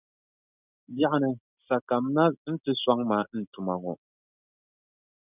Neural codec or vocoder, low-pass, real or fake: none; 3.6 kHz; real